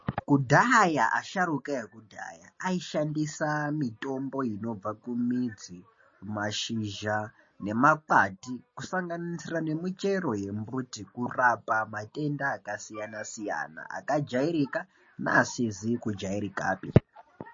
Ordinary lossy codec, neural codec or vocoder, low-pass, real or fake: MP3, 32 kbps; none; 7.2 kHz; real